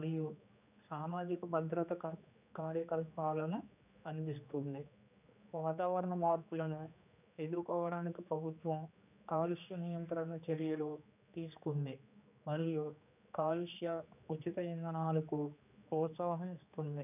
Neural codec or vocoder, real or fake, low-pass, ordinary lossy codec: codec, 16 kHz, 2 kbps, X-Codec, HuBERT features, trained on general audio; fake; 3.6 kHz; none